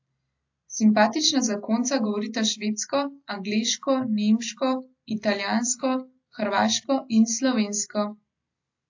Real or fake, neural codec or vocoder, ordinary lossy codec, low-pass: real; none; AAC, 48 kbps; 7.2 kHz